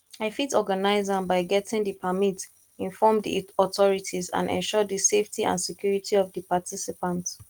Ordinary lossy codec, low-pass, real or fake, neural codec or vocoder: Opus, 24 kbps; 19.8 kHz; real; none